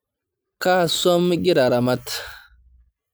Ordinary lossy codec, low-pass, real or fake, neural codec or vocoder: none; none; real; none